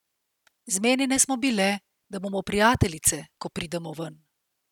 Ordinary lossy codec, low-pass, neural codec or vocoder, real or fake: none; 19.8 kHz; none; real